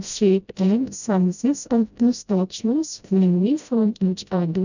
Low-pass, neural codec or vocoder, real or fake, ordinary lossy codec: 7.2 kHz; codec, 16 kHz, 0.5 kbps, FreqCodec, smaller model; fake; none